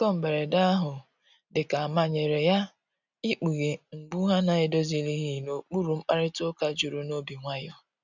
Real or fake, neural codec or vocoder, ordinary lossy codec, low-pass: real; none; none; 7.2 kHz